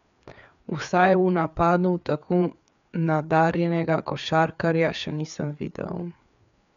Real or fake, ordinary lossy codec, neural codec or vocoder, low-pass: fake; none; codec, 16 kHz, 4 kbps, FreqCodec, larger model; 7.2 kHz